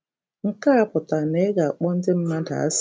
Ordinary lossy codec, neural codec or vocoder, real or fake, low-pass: none; none; real; none